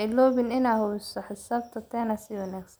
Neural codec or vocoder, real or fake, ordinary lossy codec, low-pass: none; real; none; none